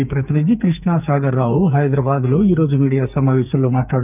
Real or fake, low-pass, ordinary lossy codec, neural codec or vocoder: fake; 3.6 kHz; none; codec, 44.1 kHz, 2.6 kbps, SNAC